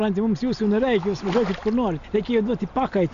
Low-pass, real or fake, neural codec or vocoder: 7.2 kHz; real; none